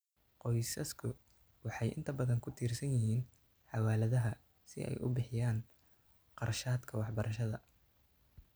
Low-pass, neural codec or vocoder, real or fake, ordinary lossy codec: none; none; real; none